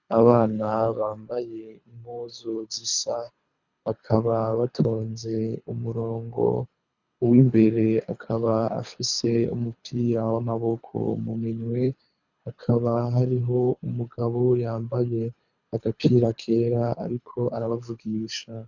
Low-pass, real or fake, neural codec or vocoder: 7.2 kHz; fake; codec, 24 kHz, 3 kbps, HILCodec